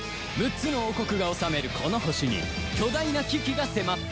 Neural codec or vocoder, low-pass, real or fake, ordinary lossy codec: none; none; real; none